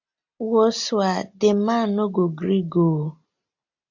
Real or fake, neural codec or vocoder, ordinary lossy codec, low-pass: real; none; AAC, 48 kbps; 7.2 kHz